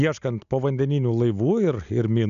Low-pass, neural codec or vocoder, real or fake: 7.2 kHz; none; real